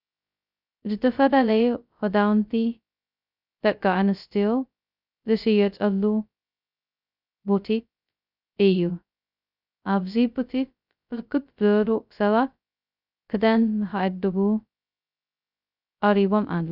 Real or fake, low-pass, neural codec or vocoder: fake; 5.4 kHz; codec, 16 kHz, 0.2 kbps, FocalCodec